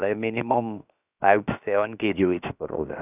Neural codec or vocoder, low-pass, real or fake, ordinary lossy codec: codec, 16 kHz, about 1 kbps, DyCAST, with the encoder's durations; 3.6 kHz; fake; none